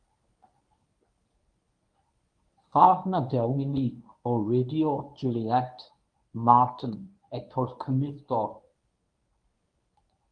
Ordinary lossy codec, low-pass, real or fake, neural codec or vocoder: Opus, 32 kbps; 9.9 kHz; fake; codec, 24 kHz, 0.9 kbps, WavTokenizer, medium speech release version 1